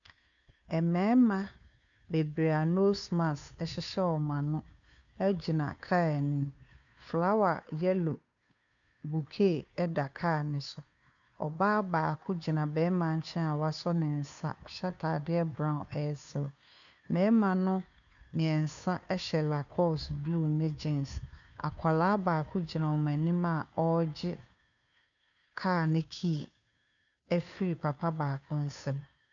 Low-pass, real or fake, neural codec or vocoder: 7.2 kHz; fake; codec, 16 kHz, 2 kbps, FunCodec, trained on Chinese and English, 25 frames a second